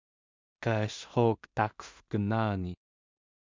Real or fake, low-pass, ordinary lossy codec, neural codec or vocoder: fake; 7.2 kHz; MP3, 64 kbps; codec, 16 kHz in and 24 kHz out, 0.4 kbps, LongCat-Audio-Codec, two codebook decoder